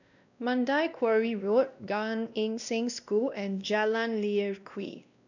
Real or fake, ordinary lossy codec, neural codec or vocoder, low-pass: fake; none; codec, 16 kHz, 1 kbps, X-Codec, WavLM features, trained on Multilingual LibriSpeech; 7.2 kHz